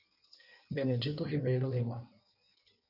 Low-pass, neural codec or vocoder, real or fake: 5.4 kHz; codec, 16 kHz in and 24 kHz out, 1.1 kbps, FireRedTTS-2 codec; fake